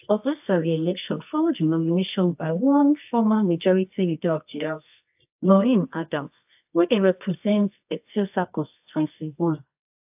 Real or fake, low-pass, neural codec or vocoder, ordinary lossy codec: fake; 3.6 kHz; codec, 24 kHz, 0.9 kbps, WavTokenizer, medium music audio release; AAC, 32 kbps